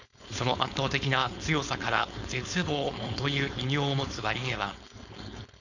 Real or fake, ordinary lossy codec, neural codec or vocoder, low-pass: fake; none; codec, 16 kHz, 4.8 kbps, FACodec; 7.2 kHz